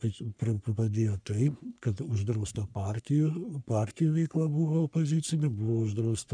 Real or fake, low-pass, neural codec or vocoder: fake; 9.9 kHz; codec, 44.1 kHz, 3.4 kbps, Pupu-Codec